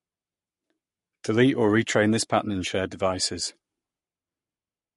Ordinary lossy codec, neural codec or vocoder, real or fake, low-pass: MP3, 48 kbps; codec, 44.1 kHz, 7.8 kbps, Pupu-Codec; fake; 14.4 kHz